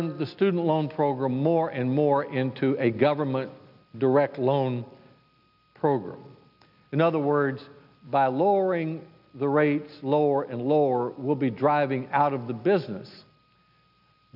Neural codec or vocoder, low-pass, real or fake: none; 5.4 kHz; real